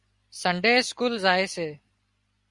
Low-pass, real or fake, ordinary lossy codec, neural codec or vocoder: 10.8 kHz; real; Opus, 64 kbps; none